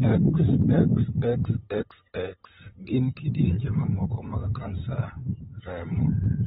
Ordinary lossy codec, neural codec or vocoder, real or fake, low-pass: AAC, 16 kbps; codec, 16 kHz, 8 kbps, FunCodec, trained on LibriTTS, 25 frames a second; fake; 7.2 kHz